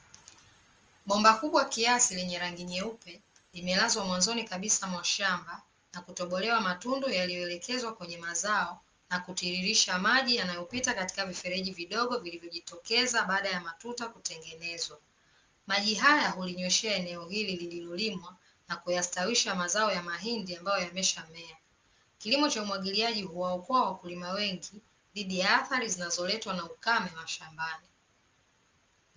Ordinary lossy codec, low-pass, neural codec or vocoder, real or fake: Opus, 24 kbps; 7.2 kHz; none; real